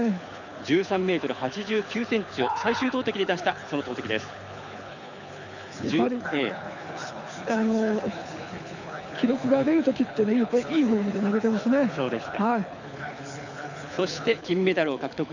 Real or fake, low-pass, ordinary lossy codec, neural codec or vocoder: fake; 7.2 kHz; none; codec, 24 kHz, 6 kbps, HILCodec